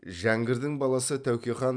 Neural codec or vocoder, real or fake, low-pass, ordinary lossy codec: none; real; 9.9 kHz; none